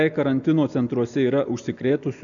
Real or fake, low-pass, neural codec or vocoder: real; 7.2 kHz; none